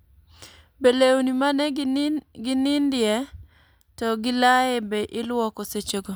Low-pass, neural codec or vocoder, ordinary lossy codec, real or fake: none; none; none; real